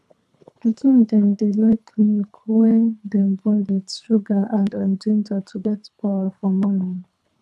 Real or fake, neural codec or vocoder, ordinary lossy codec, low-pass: fake; codec, 24 kHz, 3 kbps, HILCodec; none; none